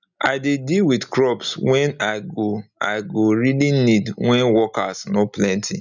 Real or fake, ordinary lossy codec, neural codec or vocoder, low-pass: real; none; none; 7.2 kHz